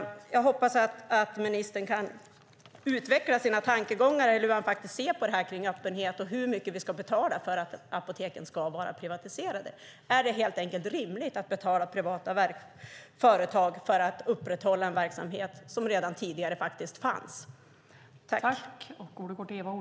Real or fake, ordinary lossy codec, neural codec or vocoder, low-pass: real; none; none; none